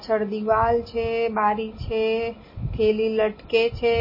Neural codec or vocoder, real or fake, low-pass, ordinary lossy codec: none; real; 5.4 kHz; MP3, 24 kbps